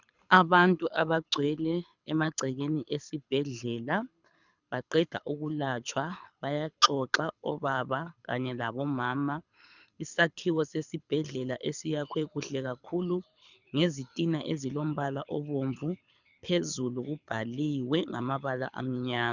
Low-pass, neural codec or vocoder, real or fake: 7.2 kHz; codec, 24 kHz, 6 kbps, HILCodec; fake